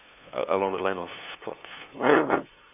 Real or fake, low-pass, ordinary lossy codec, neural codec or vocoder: fake; 3.6 kHz; none; codec, 16 kHz, 2 kbps, FunCodec, trained on LibriTTS, 25 frames a second